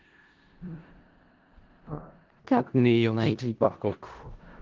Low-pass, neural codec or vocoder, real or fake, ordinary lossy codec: 7.2 kHz; codec, 16 kHz in and 24 kHz out, 0.4 kbps, LongCat-Audio-Codec, four codebook decoder; fake; Opus, 16 kbps